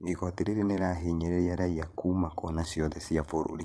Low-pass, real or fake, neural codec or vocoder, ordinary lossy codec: none; fake; vocoder, 22.05 kHz, 80 mel bands, Vocos; none